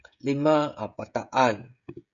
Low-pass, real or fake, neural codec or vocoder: 7.2 kHz; fake; codec, 16 kHz, 16 kbps, FreqCodec, smaller model